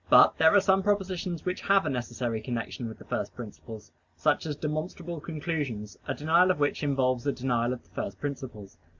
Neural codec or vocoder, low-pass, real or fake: none; 7.2 kHz; real